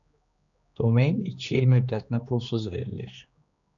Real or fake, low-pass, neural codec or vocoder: fake; 7.2 kHz; codec, 16 kHz, 2 kbps, X-Codec, HuBERT features, trained on general audio